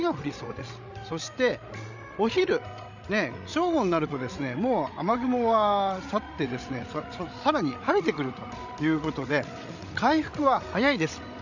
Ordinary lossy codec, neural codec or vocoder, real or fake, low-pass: none; codec, 16 kHz, 16 kbps, FreqCodec, larger model; fake; 7.2 kHz